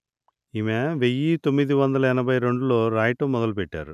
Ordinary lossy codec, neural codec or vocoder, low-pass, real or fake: none; none; 14.4 kHz; real